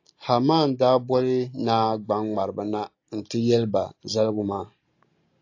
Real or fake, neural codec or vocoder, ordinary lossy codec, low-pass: real; none; AAC, 48 kbps; 7.2 kHz